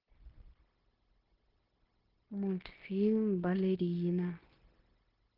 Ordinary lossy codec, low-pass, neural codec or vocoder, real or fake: Opus, 16 kbps; 5.4 kHz; codec, 16 kHz, 0.9 kbps, LongCat-Audio-Codec; fake